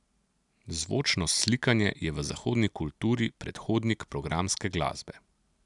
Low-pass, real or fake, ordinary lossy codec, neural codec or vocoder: 10.8 kHz; real; none; none